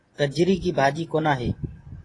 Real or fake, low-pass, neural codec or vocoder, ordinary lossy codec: fake; 10.8 kHz; vocoder, 24 kHz, 100 mel bands, Vocos; AAC, 32 kbps